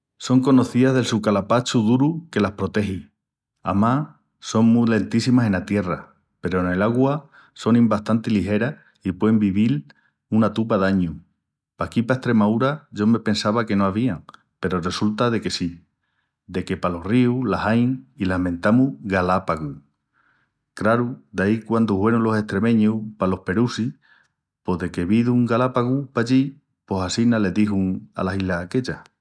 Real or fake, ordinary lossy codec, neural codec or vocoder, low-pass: real; none; none; none